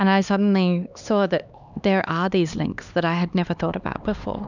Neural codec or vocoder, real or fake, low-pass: codec, 16 kHz, 2 kbps, X-Codec, HuBERT features, trained on LibriSpeech; fake; 7.2 kHz